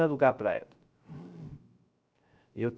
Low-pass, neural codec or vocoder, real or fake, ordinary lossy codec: none; codec, 16 kHz, 0.3 kbps, FocalCodec; fake; none